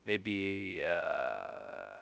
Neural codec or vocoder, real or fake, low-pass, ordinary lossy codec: codec, 16 kHz, 0.2 kbps, FocalCodec; fake; none; none